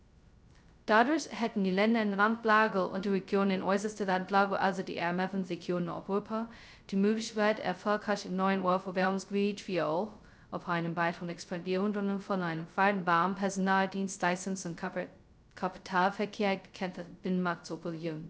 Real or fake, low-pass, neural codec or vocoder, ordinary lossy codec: fake; none; codec, 16 kHz, 0.2 kbps, FocalCodec; none